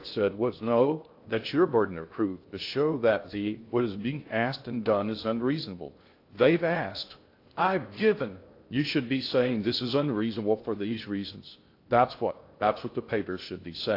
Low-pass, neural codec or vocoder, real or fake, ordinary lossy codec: 5.4 kHz; codec, 16 kHz in and 24 kHz out, 0.6 kbps, FocalCodec, streaming, 2048 codes; fake; AAC, 32 kbps